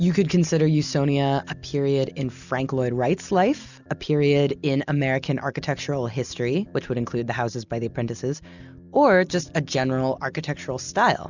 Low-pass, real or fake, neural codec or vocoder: 7.2 kHz; real; none